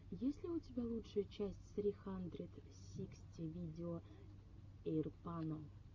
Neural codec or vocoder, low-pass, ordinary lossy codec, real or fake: none; 7.2 kHz; MP3, 48 kbps; real